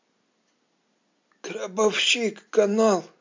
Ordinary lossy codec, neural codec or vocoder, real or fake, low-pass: MP3, 64 kbps; none; real; 7.2 kHz